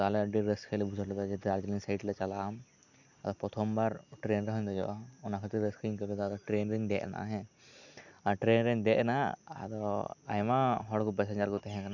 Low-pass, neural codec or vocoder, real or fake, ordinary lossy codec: 7.2 kHz; none; real; none